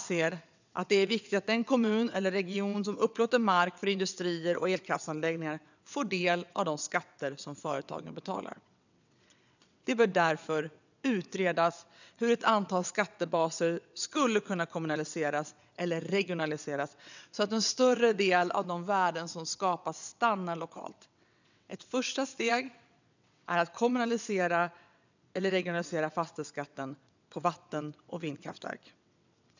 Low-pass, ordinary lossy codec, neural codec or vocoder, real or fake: 7.2 kHz; none; vocoder, 22.05 kHz, 80 mel bands, WaveNeXt; fake